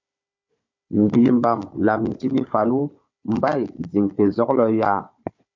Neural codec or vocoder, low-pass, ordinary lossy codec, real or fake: codec, 16 kHz, 4 kbps, FunCodec, trained on Chinese and English, 50 frames a second; 7.2 kHz; MP3, 48 kbps; fake